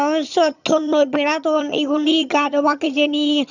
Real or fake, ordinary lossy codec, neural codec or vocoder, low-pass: fake; none; vocoder, 22.05 kHz, 80 mel bands, HiFi-GAN; 7.2 kHz